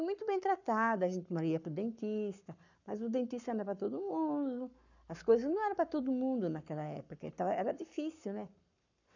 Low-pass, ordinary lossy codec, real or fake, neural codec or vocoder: 7.2 kHz; none; fake; codec, 44.1 kHz, 7.8 kbps, Pupu-Codec